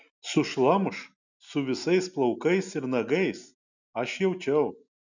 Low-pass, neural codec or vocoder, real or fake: 7.2 kHz; none; real